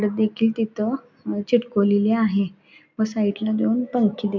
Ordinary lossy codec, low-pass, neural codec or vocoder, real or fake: none; 7.2 kHz; none; real